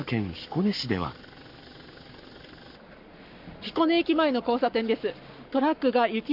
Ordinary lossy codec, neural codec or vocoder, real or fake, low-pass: MP3, 48 kbps; codec, 44.1 kHz, 7.8 kbps, Pupu-Codec; fake; 5.4 kHz